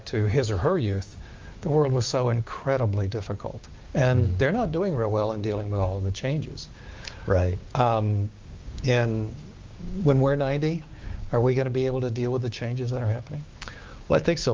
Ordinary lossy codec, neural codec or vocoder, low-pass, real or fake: Opus, 32 kbps; codec, 16 kHz, 6 kbps, DAC; 7.2 kHz; fake